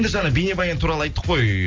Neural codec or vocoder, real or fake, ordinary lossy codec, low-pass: none; real; Opus, 24 kbps; 7.2 kHz